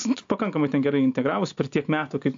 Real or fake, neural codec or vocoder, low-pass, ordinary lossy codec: real; none; 7.2 kHz; AAC, 64 kbps